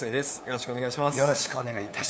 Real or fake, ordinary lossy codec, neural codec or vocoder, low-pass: fake; none; codec, 16 kHz, 2 kbps, FunCodec, trained on LibriTTS, 25 frames a second; none